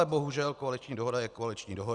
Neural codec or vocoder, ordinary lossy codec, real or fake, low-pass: none; Opus, 64 kbps; real; 10.8 kHz